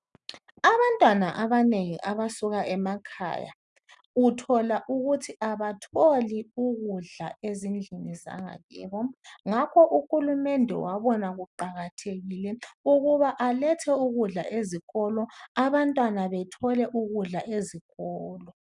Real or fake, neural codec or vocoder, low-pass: real; none; 10.8 kHz